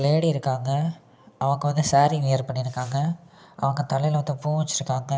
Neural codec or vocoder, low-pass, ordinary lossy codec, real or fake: none; none; none; real